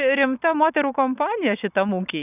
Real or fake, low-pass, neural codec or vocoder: real; 3.6 kHz; none